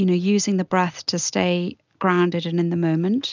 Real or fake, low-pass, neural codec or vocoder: real; 7.2 kHz; none